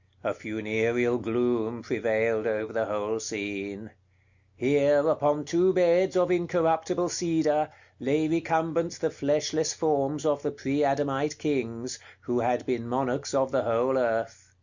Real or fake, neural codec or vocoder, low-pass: real; none; 7.2 kHz